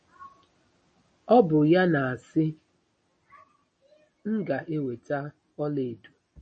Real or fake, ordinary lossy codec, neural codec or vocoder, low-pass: real; MP3, 32 kbps; none; 10.8 kHz